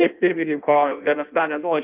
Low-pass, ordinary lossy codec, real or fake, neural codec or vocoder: 3.6 kHz; Opus, 24 kbps; fake; codec, 16 kHz in and 24 kHz out, 0.6 kbps, FireRedTTS-2 codec